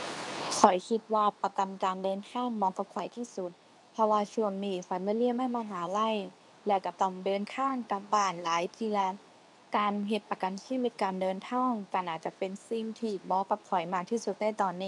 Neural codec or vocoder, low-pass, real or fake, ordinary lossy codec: codec, 24 kHz, 0.9 kbps, WavTokenizer, medium speech release version 2; 10.8 kHz; fake; none